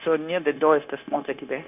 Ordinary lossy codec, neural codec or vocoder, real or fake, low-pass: none; codec, 16 kHz, 0.9 kbps, LongCat-Audio-Codec; fake; 3.6 kHz